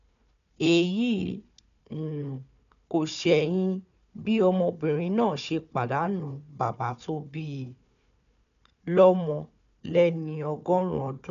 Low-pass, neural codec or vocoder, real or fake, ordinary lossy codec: 7.2 kHz; codec, 16 kHz, 4 kbps, FunCodec, trained on Chinese and English, 50 frames a second; fake; none